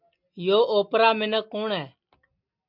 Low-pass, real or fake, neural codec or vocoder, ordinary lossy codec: 5.4 kHz; real; none; MP3, 48 kbps